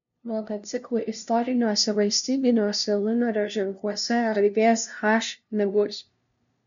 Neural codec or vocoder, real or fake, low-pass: codec, 16 kHz, 0.5 kbps, FunCodec, trained on LibriTTS, 25 frames a second; fake; 7.2 kHz